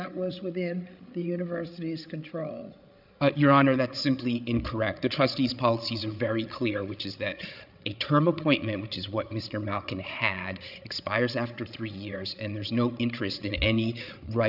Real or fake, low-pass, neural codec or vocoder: fake; 5.4 kHz; codec, 16 kHz, 8 kbps, FreqCodec, larger model